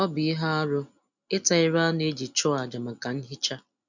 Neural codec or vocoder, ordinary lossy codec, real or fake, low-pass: none; none; real; 7.2 kHz